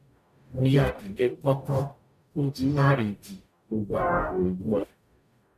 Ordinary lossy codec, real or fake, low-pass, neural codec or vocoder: MP3, 96 kbps; fake; 14.4 kHz; codec, 44.1 kHz, 0.9 kbps, DAC